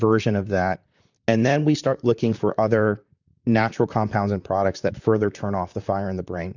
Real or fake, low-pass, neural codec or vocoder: fake; 7.2 kHz; vocoder, 44.1 kHz, 128 mel bands, Pupu-Vocoder